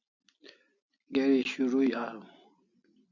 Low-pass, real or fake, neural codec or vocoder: 7.2 kHz; real; none